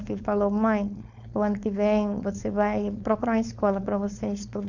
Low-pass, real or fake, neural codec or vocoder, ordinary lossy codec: 7.2 kHz; fake; codec, 16 kHz, 4.8 kbps, FACodec; none